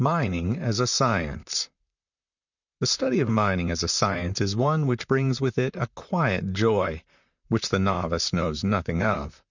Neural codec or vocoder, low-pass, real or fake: vocoder, 44.1 kHz, 128 mel bands, Pupu-Vocoder; 7.2 kHz; fake